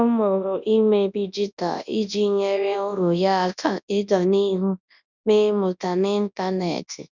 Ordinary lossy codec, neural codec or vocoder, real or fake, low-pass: none; codec, 24 kHz, 0.9 kbps, WavTokenizer, large speech release; fake; 7.2 kHz